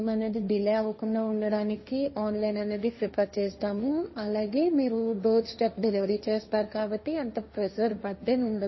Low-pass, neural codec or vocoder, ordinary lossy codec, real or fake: 7.2 kHz; codec, 16 kHz, 1.1 kbps, Voila-Tokenizer; MP3, 24 kbps; fake